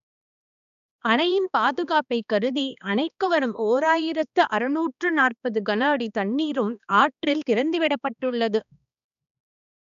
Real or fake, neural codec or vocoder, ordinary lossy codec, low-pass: fake; codec, 16 kHz, 2 kbps, X-Codec, HuBERT features, trained on balanced general audio; none; 7.2 kHz